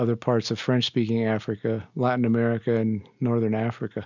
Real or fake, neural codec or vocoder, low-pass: real; none; 7.2 kHz